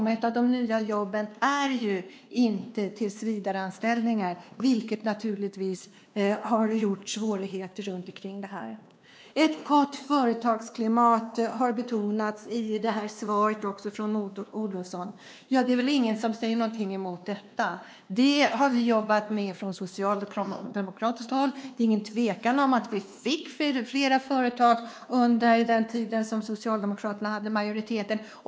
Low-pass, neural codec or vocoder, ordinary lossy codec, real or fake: none; codec, 16 kHz, 2 kbps, X-Codec, WavLM features, trained on Multilingual LibriSpeech; none; fake